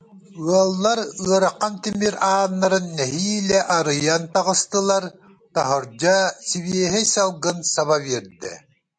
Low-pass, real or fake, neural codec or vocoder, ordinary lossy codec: 9.9 kHz; real; none; MP3, 64 kbps